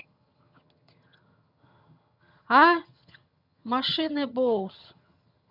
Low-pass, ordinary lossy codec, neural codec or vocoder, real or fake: 5.4 kHz; none; vocoder, 22.05 kHz, 80 mel bands, HiFi-GAN; fake